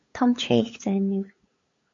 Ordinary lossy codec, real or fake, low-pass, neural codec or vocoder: MP3, 48 kbps; fake; 7.2 kHz; codec, 16 kHz, 8 kbps, FunCodec, trained on LibriTTS, 25 frames a second